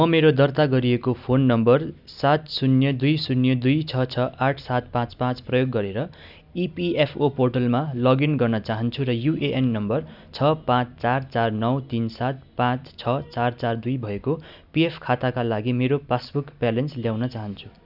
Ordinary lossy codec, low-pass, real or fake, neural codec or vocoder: none; 5.4 kHz; real; none